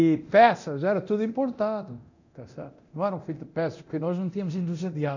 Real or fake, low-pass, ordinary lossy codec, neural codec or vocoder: fake; 7.2 kHz; none; codec, 24 kHz, 0.9 kbps, DualCodec